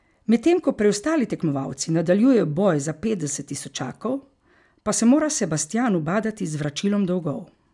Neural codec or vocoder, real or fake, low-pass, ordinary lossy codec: none; real; 10.8 kHz; none